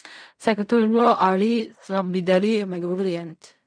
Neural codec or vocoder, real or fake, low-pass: codec, 16 kHz in and 24 kHz out, 0.4 kbps, LongCat-Audio-Codec, fine tuned four codebook decoder; fake; 9.9 kHz